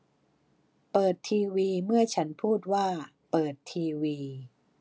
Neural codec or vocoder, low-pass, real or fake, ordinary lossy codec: none; none; real; none